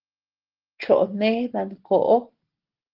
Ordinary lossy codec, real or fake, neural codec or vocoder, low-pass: Opus, 16 kbps; real; none; 5.4 kHz